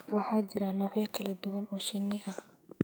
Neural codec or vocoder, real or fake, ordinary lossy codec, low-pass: codec, 44.1 kHz, 2.6 kbps, SNAC; fake; none; none